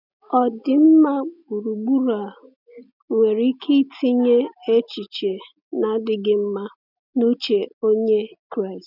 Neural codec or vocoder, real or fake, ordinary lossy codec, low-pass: none; real; none; 5.4 kHz